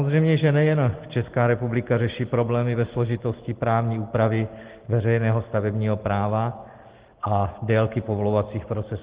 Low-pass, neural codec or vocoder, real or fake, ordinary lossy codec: 3.6 kHz; none; real; Opus, 16 kbps